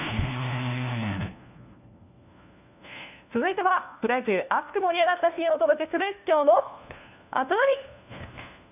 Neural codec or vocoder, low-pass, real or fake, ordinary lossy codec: codec, 16 kHz, 1 kbps, FunCodec, trained on LibriTTS, 50 frames a second; 3.6 kHz; fake; none